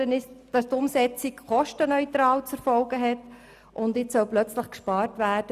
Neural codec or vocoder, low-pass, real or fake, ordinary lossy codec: none; 14.4 kHz; real; Opus, 64 kbps